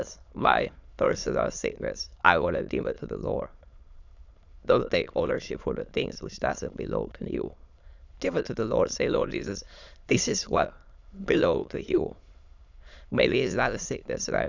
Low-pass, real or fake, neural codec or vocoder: 7.2 kHz; fake; autoencoder, 22.05 kHz, a latent of 192 numbers a frame, VITS, trained on many speakers